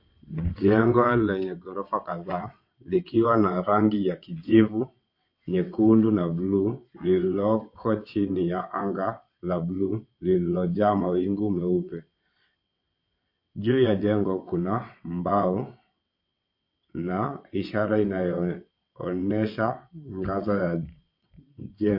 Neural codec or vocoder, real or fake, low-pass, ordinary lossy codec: vocoder, 22.05 kHz, 80 mel bands, WaveNeXt; fake; 5.4 kHz; MP3, 32 kbps